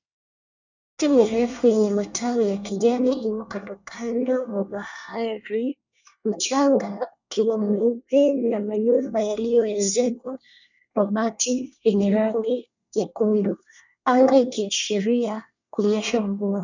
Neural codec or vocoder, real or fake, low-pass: codec, 24 kHz, 1 kbps, SNAC; fake; 7.2 kHz